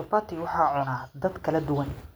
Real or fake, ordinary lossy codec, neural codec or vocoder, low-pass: real; none; none; none